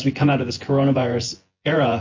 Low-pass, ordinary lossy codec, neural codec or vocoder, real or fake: 7.2 kHz; MP3, 32 kbps; vocoder, 24 kHz, 100 mel bands, Vocos; fake